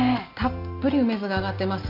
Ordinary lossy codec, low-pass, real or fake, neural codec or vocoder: none; 5.4 kHz; real; none